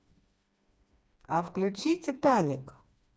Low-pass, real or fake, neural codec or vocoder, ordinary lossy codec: none; fake; codec, 16 kHz, 2 kbps, FreqCodec, smaller model; none